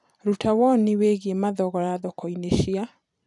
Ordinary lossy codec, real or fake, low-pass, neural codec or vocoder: none; fake; 10.8 kHz; vocoder, 44.1 kHz, 128 mel bands every 256 samples, BigVGAN v2